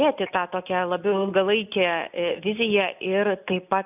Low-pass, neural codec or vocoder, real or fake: 3.6 kHz; none; real